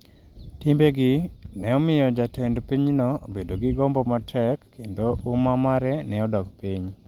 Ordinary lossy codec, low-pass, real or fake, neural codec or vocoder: Opus, 24 kbps; 19.8 kHz; real; none